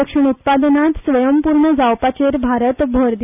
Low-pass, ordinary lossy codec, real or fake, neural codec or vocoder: 3.6 kHz; none; real; none